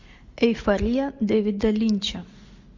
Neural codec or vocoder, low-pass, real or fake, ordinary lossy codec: none; 7.2 kHz; real; MP3, 48 kbps